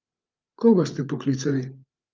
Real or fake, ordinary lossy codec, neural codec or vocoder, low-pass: fake; Opus, 24 kbps; codec, 16 kHz, 8 kbps, FreqCodec, larger model; 7.2 kHz